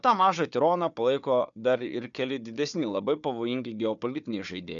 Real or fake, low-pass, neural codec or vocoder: fake; 7.2 kHz; codec, 16 kHz, 4 kbps, FunCodec, trained on Chinese and English, 50 frames a second